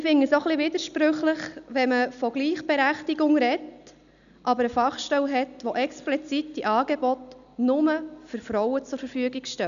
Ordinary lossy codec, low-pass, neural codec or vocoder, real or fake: none; 7.2 kHz; none; real